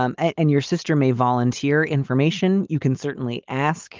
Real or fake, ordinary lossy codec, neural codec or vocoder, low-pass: real; Opus, 32 kbps; none; 7.2 kHz